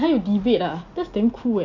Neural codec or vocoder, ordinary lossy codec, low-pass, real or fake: none; none; 7.2 kHz; real